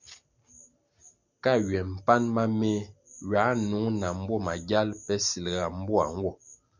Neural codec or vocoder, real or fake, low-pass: none; real; 7.2 kHz